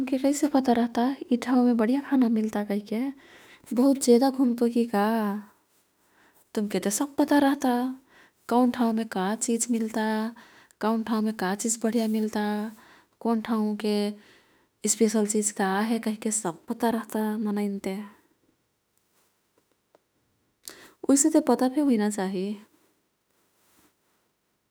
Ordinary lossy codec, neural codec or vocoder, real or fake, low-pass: none; autoencoder, 48 kHz, 32 numbers a frame, DAC-VAE, trained on Japanese speech; fake; none